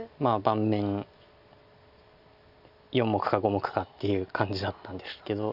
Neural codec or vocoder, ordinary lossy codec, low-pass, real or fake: none; none; 5.4 kHz; real